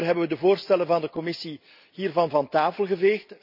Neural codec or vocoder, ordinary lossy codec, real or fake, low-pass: none; none; real; 5.4 kHz